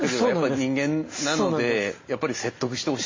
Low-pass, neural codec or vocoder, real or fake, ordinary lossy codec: 7.2 kHz; none; real; MP3, 32 kbps